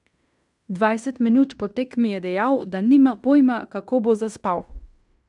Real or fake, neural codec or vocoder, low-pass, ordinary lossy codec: fake; codec, 16 kHz in and 24 kHz out, 0.9 kbps, LongCat-Audio-Codec, fine tuned four codebook decoder; 10.8 kHz; none